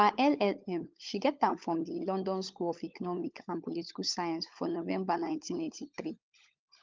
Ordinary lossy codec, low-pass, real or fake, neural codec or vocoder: Opus, 24 kbps; 7.2 kHz; fake; codec, 16 kHz, 16 kbps, FunCodec, trained on LibriTTS, 50 frames a second